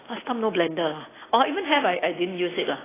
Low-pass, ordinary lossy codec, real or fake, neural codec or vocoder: 3.6 kHz; AAC, 16 kbps; real; none